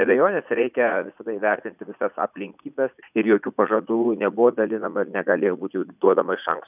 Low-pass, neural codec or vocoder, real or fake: 3.6 kHz; vocoder, 44.1 kHz, 80 mel bands, Vocos; fake